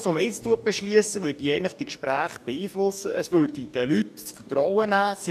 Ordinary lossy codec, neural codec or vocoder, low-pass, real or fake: none; codec, 44.1 kHz, 2.6 kbps, DAC; 14.4 kHz; fake